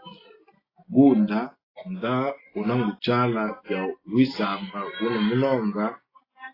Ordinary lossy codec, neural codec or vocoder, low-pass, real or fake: AAC, 24 kbps; none; 5.4 kHz; real